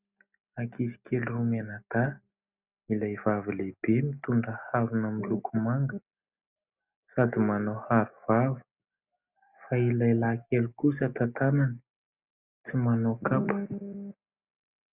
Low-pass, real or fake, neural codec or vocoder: 3.6 kHz; real; none